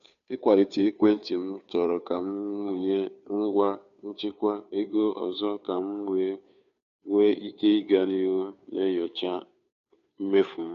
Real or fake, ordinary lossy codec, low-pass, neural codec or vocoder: fake; none; 7.2 kHz; codec, 16 kHz, 2 kbps, FunCodec, trained on Chinese and English, 25 frames a second